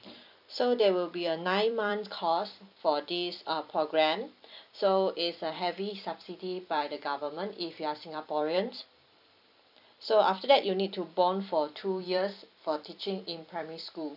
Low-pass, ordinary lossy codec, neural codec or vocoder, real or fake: 5.4 kHz; none; vocoder, 44.1 kHz, 128 mel bands every 256 samples, BigVGAN v2; fake